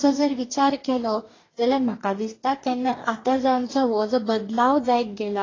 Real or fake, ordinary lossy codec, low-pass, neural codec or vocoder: fake; AAC, 32 kbps; 7.2 kHz; codec, 44.1 kHz, 2.6 kbps, DAC